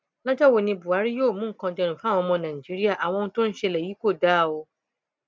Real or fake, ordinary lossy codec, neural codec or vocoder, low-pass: real; none; none; none